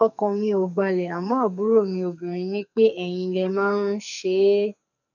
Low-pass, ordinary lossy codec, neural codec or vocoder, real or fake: 7.2 kHz; none; codec, 44.1 kHz, 2.6 kbps, SNAC; fake